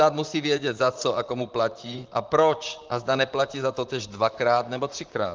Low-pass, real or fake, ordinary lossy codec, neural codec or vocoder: 7.2 kHz; fake; Opus, 32 kbps; vocoder, 44.1 kHz, 128 mel bands, Pupu-Vocoder